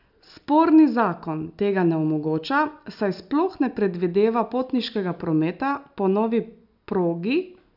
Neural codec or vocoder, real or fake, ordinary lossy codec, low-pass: none; real; none; 5.4 kHz